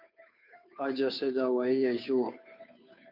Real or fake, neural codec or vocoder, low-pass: fake; codec, 16 kHz, 2 kbps, FunCodec, trained on Chinese and English, 25 frames a second; 5.4 kHz